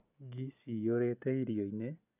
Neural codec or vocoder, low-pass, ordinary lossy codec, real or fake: none; 3.6 kHz; none; real